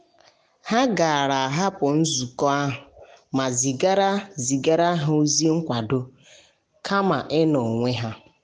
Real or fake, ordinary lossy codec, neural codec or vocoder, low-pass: real; none; none; none